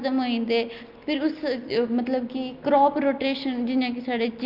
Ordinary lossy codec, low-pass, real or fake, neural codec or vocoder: Opus, 24 kbps; 5.4 kHz; real; none